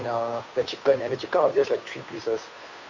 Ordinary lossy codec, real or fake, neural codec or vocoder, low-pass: none; fake; codec, 16 kHz, 2 kbps, FunCodec, trained on Chinese and English, 25 frames a second; 7.2 kHz